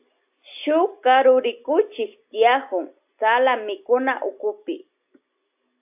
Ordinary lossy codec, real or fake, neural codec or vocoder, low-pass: AAC, 32 kbps; real; none; 3.6 kHz